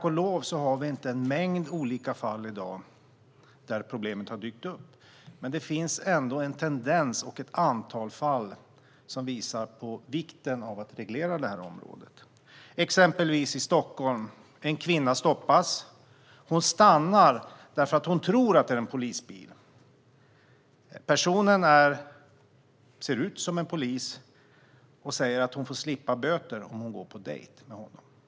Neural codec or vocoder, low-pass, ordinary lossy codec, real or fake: none; none; none; real